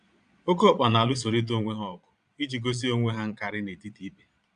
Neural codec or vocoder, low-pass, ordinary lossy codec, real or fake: vocoder, 22.05 kHz, 80 mel bands, Vocos; 9.9 kHz; MP3, 96 kbps; fake